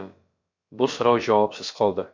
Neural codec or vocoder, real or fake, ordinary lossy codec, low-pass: codec, 16 kHz, about 1 kbps, DyCAST, with the encoder's durations; fake; MP3, 48 kbps; 7.2 kHz